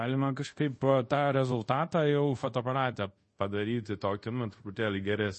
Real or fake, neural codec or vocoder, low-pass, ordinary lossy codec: fake; codec, 24 kHz, 0.5 kbps, DualCodec; 10.8 kHz; MP3, 32 kbps